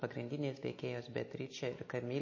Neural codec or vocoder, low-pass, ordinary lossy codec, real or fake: none; 7.2 kHz; MP3, 32 kbps; real